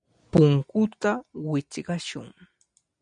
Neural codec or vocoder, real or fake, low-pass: none; real; 9.9 kHz